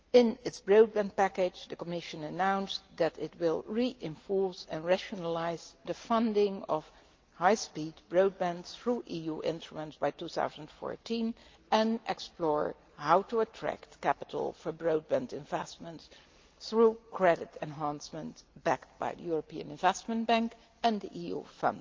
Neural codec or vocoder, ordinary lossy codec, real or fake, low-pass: none; Opus, 24 kbps; real; 7.2 kHz